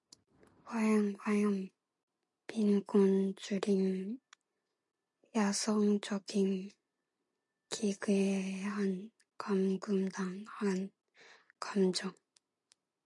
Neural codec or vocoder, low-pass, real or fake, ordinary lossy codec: none; 10.8 kHz; real; MP3, 64 kbps